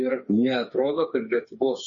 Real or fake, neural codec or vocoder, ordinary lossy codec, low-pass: fake; codec, 16 kHz, 4 kbps, FreqCodec, smaller model; MP3, 32 kbps; 7.2 kHz